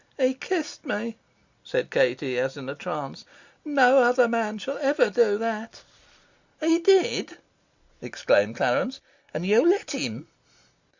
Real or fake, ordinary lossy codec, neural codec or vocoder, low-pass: real; Opus, 64 kbps; none; 7.2 kHz